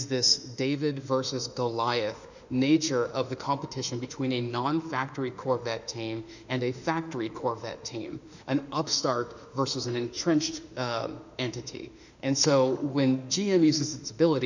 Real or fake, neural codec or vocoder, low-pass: fake; autoencoder, 48 kHz, 32 numbers a frame, DAC-VAE, trained on Japanese speech; 7.2 kHz